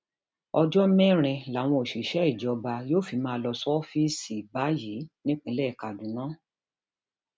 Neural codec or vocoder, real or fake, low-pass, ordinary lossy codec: none; real; none; none